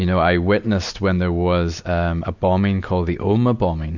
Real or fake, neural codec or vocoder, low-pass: real; none; 7.2 kHz